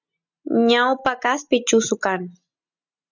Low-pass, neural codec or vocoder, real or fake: 7.2 kHz; none; real